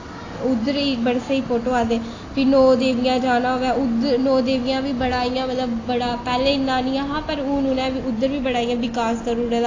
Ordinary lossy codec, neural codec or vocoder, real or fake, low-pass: AAC, 32 kbps; none; real; 7.2 kHz